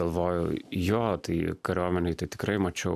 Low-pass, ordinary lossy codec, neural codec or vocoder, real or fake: 14.4 kHz; AAC, 96 kbps; none; real